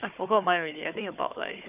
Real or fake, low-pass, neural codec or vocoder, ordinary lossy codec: fake; 3.6 kHz; codec, 16 kHz, 4 kbps, FunCodec, trained on Chinese and English, 50 frames a second; none